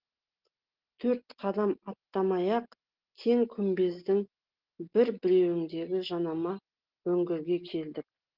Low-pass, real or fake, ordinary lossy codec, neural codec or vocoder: 5.4 kHz; real; Opus, 16 kbps; none